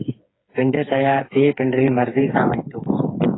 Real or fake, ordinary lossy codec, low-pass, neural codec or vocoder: fake; AAC, 16 kbps; 7.2 kHz; codec, 16 kHz, 4 kbps, FreqCodec, smaller model